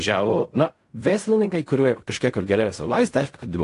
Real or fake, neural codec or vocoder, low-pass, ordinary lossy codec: fake; codec, 16 kHz in and 24 kHz out, 0.4 kbps, LongCat-Audio-Codec, fine tuned four codebook decoder; 10.8 kHz; AAC, 48 kbps